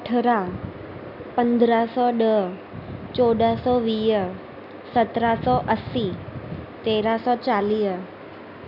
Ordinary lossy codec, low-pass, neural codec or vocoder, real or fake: none; 5.4 kHz; none; real